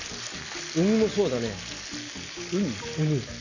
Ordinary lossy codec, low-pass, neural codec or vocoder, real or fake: none; 7.2 kHz; none; real